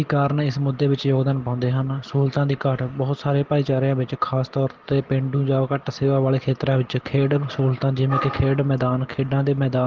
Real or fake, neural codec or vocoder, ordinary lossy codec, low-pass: real; none; Opus, 16 kbps; 7.2 kHz